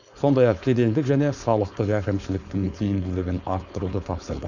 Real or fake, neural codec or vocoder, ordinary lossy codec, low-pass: fake; codec, 16 kHz, 4.8 kbps, FACodec; none; 7.2 kHz